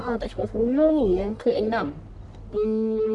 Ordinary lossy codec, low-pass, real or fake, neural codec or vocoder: none; 10.8 kHz; fake; codec, 44.1 kHz, 1.7 kbps, Pupu-Codec